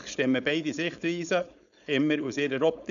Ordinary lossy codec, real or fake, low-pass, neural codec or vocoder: none; fake; 7.2 kHz; codec, 16 kHz, 4.8 kbps, FACodec